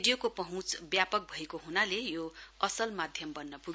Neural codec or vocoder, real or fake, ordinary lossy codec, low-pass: none; real; none; none